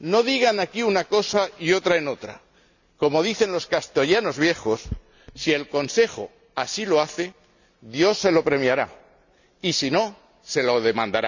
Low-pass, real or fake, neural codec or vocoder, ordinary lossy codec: 7.2 kHz; real; none; none